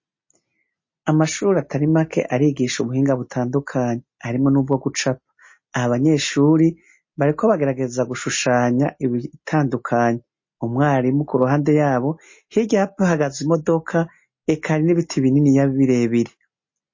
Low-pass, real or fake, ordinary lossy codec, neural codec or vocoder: 7.2 kHz; real; MP3, 32 kbps; none